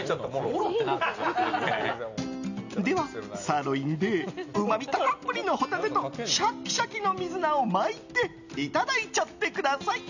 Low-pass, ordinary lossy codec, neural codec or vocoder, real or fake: 7.2 kHz; none; none; real